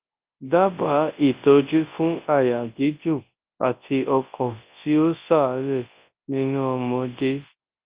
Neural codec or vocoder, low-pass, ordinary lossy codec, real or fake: codec, 24 kHz, 0.9 kbps, WavTokenizer, large speech release; 3.6 kHz; Opus, 32 kbps; fake